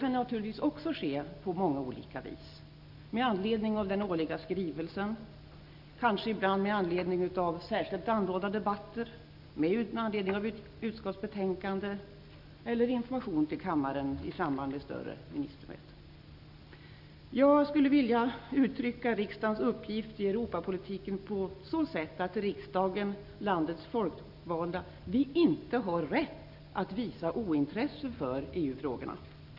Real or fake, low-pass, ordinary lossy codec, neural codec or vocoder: real; 5.4 kHz; none; none